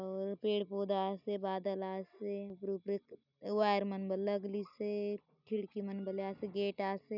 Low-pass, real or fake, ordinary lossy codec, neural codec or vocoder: 5.4 kHz; real; none; none